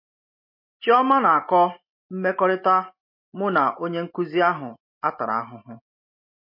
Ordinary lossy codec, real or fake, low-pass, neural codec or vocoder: MP3, 32 kbps; real; 5.4 kHz; none